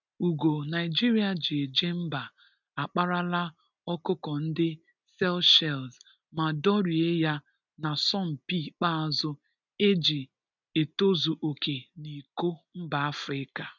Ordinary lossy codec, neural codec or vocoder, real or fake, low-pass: none; none; real; 7.2 kHz